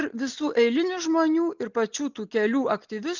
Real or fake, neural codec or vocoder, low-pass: real; none; 7.2 kHz